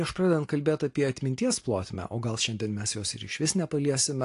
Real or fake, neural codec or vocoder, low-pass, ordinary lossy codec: real; none; 10.8 kHz; AAC, 48 kbps